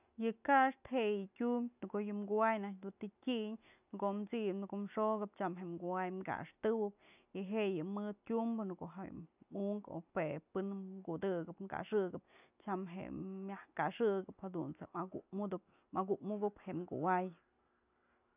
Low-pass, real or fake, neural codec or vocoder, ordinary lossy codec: 3.6 kHz; real; none; none